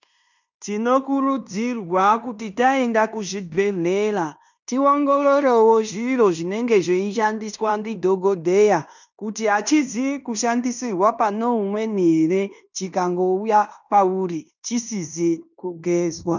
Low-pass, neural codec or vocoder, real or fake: 7.2 kHz; codec, 16 kHz in and 24 kHz out, 0.9 kbps, LongCat-Audio-Codec, fine tuned four codebook decoder; fake